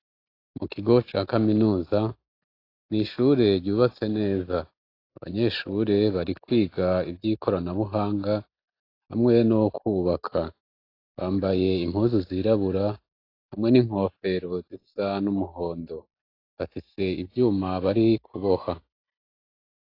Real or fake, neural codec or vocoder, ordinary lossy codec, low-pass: real; none; AAC, 32 kbps; 5.4 kHz